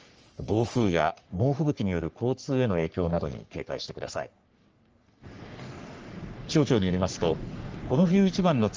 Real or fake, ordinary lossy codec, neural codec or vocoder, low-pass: fake; Opus, 24 kbps; codec, 44.1 kHz, 3.4 kbps, Pupu-Codec; 7.2 kHz